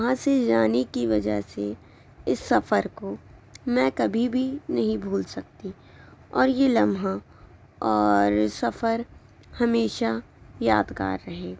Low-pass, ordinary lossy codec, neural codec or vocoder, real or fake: none; none; none; real